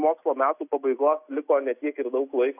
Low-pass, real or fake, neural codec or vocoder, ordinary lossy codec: 3.6 kHz; real; none; AAC, 32 kbps